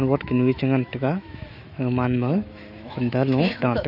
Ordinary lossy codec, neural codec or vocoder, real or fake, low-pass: none; autoencoder, 48 kHz, 128 numbers a frame, DAC-VAE, trained on Japanese speech; fake; 5.4 kHz